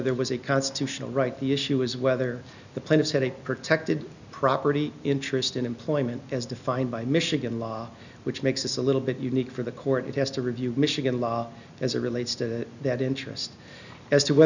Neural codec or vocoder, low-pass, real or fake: none; 7.2 kHz; real